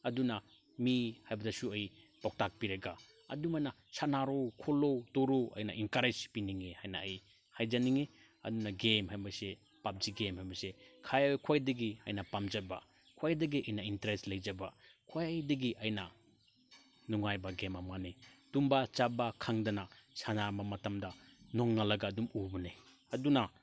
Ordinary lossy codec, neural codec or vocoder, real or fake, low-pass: none; none; real; none